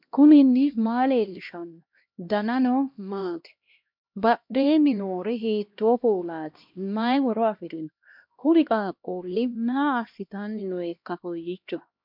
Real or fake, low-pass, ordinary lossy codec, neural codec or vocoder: fake; 5.4 kHz; MP3, 48 kbps; codec, 16 kHz, 1 kbps, X-Codec, HuBERT features, trained on LibriSpeech